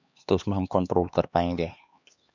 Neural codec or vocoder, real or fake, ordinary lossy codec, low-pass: codec, 16 kHz, 2 kbps, X-Codec, HuBERT features, trained on LibriSpeech; fake; none; 7.2 kHz